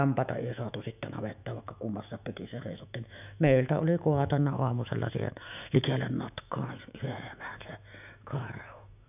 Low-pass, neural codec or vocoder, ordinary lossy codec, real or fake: 3.6 kHz; autoencoder, 48 kHz, 128 numbers a frame, DAC-VAE, trained on Japanese speech; none; fake